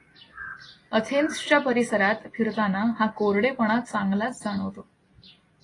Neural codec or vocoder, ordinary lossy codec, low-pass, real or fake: none; AAC, 32 kbps; 10.8 kHz; real